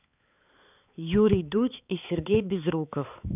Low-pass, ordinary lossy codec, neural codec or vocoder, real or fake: 3.6 kHz; none; codec, 16 kHz, 4 kbps, X-Codec, HuBERT features, trained on balanced general audio; fake